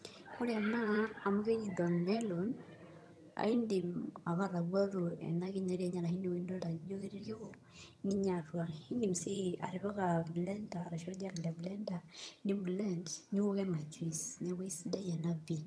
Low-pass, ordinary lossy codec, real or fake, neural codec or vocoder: none; none; fake; vocoder, 22.05 kHz, 80 mel bands, HiFi-GAN